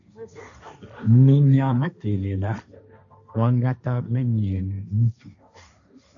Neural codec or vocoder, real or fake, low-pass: codec, 16 kHz, 1.1 kbps, Voila-Tokenizer; fake; 7.2 kHz